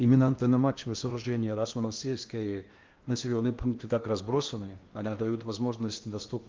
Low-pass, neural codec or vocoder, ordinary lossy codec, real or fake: 7.2 kHz; codec, 16 kHz in and 24 kHz out, 0.8 kbps, FocalCodec, streaming, 65536 codes; Opus, 32 kbps; fake